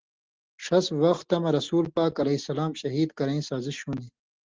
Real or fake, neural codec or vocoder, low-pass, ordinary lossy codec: real; none; 7.2 kHz; Opus, 16 kbps